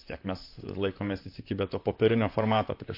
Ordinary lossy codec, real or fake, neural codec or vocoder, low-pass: MP3, 32 kbps; fake; vocoder, 44.1 kHz, 128 mel bands, Pupu-Vocoder; 5.4 kHz